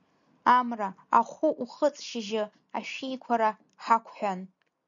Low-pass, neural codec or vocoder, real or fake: 7.2 kHz; none; real